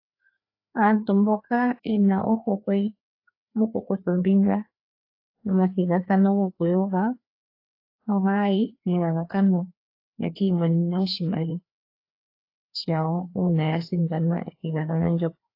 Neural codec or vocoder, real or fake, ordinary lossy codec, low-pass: codec, 16 kHz, 2 kbps, FreqCodec, larger model; fake; AAC, 32 kbps; 5.4 kHz